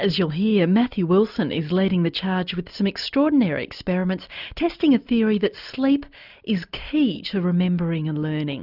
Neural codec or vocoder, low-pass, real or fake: none; 5.4 kHz; real